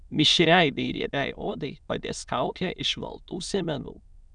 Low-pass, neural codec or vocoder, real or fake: 9.9 kHz; autoencoder, 22.05 kHz, a latent of 192 numbers a frame, VITS, trained on many speakers; fake